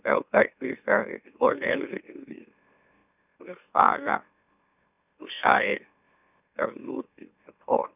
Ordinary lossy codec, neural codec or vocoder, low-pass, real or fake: none; autoencoder, 44.1 kHz, a latent of 192 numbers a frame, MeloTTS; 3.6 kHz; fake